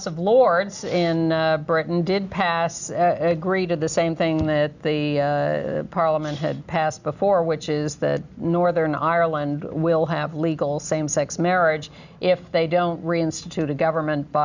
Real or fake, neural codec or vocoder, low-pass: real; none; 7.2 kHz